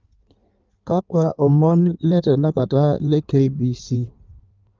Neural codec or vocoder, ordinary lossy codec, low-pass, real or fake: codec, 16 kHz in and 24 kHz out, 1.1 kbps, FireRedTTS-2 codec; Opus, 24 kbps; 7.2 kHz; fake